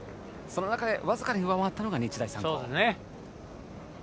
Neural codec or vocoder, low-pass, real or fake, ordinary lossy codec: none; none; real; none